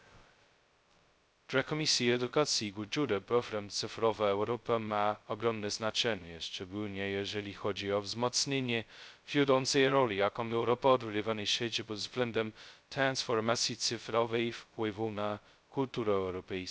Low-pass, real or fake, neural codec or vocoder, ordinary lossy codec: none; fake; codec, 16 kHz, 0.2 kbps, FocalCodec; none